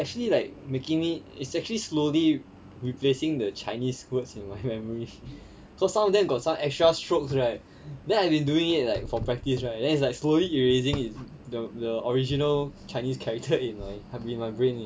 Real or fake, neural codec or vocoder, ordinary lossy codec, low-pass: real; none; none; none